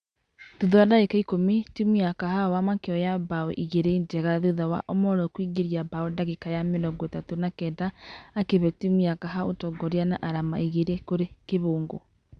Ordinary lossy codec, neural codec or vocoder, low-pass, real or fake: Opus, 64 kbps; none; 9.9 kHz; real